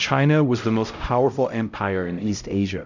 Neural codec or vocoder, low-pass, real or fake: codec, 16 kHz, 0.5 kbps, X-Codec, HuBERT features, trained on LibriSpeech; 7.2 kHz; fake